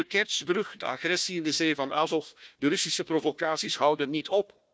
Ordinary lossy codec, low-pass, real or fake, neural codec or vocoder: none; none; fake; codec, 16 kHz, 1 kbps, FunCodec, trained on Chinese and English, 50 frames a second